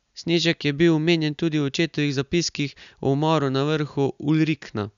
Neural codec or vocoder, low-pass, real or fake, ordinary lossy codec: none; 7.2 kHz; real; none